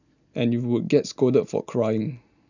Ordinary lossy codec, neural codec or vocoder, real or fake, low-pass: none; none; real; 7.2 kHz